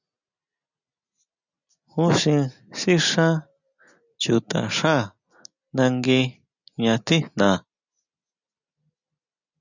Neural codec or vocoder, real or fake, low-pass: none; real; 7.2 kHz